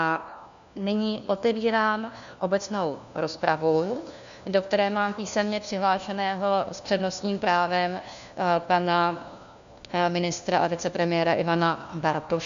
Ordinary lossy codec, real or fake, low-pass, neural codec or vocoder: MP3, 96 kbps; fake; 7.2 kHz; codec, 16 kHz, 1 kbps, FunCodec, trained on LibriTTS, 50 frames a second